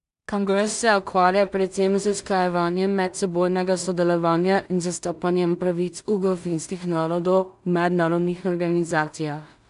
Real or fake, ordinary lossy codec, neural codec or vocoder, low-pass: fake; none; codec, 16 kHz in and 24 kHz out, 0.4 kbps, LongCat-Audio-Codec, two codebook decoder; 10.8 kHz